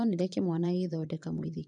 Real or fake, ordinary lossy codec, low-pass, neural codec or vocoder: fake; none; 9.9 kHz; vocoder, 22.05 kHz, 80 mel bands, Vocos